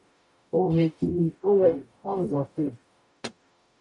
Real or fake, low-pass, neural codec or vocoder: fake; 10.8 kHz; codec, 44.1 kHz, 0.9 kbps, DAC